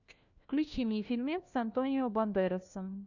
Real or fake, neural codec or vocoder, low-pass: fake; codec, 16 kHz, 1 kbps, FunCodec, trained on LibriTTS, 50 frames a second; 7.2 kHz